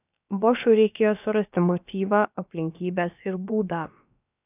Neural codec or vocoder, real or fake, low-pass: codec, 16 kHz, about 1 kbps, DyCAST, with the encoder's durations; fake; 3.6 kHz